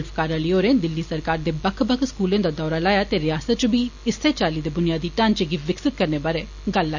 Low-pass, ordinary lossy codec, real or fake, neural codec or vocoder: 7.2 kHz; none; real; none